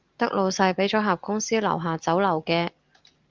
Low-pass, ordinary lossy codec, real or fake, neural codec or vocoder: 7.2 kHz; Opus, 32 kbps; real; none